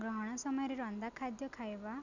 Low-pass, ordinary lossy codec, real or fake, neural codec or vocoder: 7.2 kHz; none; real; none